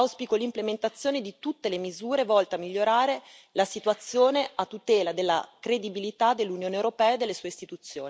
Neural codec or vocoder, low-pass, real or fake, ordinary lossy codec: none; none; real; none